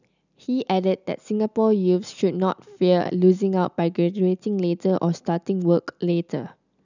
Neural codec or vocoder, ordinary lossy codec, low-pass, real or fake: none; none; 7.2 kHz; real